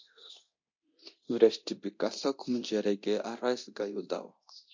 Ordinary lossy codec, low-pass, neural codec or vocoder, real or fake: MP3, 48 kbps; 7.2 kHz; codec, 24 kHz, 0.9 kbps, DualCodec; fake